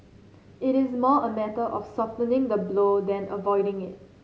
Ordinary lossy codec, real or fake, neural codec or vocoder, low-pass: none; real; none; none